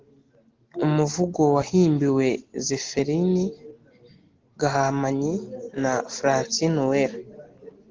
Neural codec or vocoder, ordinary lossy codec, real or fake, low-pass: none; Opus, 16 kbps; real; 7.2 kHz